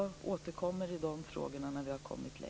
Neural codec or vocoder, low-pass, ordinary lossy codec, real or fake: none; none; none; real